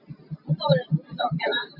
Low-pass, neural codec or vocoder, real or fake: 5.4 kHz; none; real